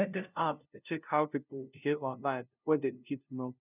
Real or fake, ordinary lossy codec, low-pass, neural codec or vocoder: fake; none; 3.6 kHz; codec, 16 kHz, 0.5 kbps, FunCodec, trained on LibriTTS, 25 frames a second